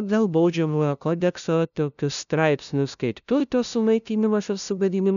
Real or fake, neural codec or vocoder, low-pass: fake; codec, 16 kHz, 0.5 kbps, FunCodec, trained on LibriTTS, 25 frames a second; 7.2 kHz